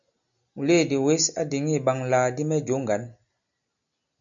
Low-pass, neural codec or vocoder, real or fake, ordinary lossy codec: 7.2 kHz; none; real; MP3, 64 kbps